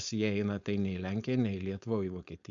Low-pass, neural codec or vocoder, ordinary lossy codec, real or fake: 7.2 kHz; codec, 16 kHz, 4.8 kbps, FACodec; MP3, 64 kbps; fake